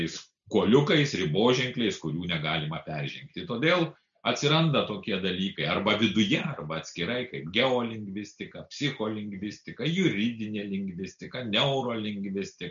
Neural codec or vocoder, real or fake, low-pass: none; real; 7.2 kHz